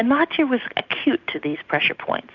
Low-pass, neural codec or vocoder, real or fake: 7.2 kHz; none; real